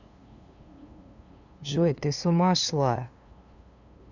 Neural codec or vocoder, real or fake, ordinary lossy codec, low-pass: codec, 16 kHz, 2 kbps, FunCodec, trained on LibriTTS, 25 frames a second; fake; none; 7.2 kHz